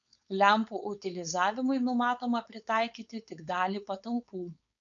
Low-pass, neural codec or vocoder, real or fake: 7.2 kHz; codec, 16 kHz, 4.8 kbps, FACodec; fake